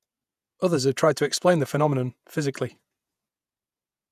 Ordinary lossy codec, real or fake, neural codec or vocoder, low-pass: none; fake; vocoder, 48 kHz, 128 mel bands, Vocos; 14.4 kHz